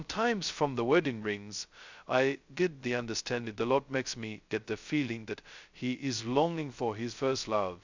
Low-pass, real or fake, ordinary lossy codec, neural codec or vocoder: 7.2 kHz; fake; Opus, 64 kbps; codec, 16 kHz, 0.2 kbps, FocalCodec